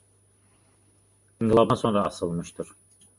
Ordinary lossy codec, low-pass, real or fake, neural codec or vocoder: Opus, 64 kbps; 10.8 kHz; real; none